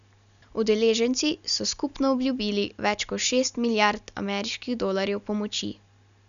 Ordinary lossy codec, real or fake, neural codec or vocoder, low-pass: none; real; none; 7.2 kHz